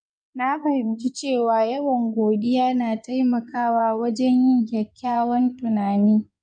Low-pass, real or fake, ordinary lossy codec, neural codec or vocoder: 9.9 kHz; fake; none; codec, 16 kHz in and 24 kHz out, 2.2 kbps, FireRedTTS-2 codec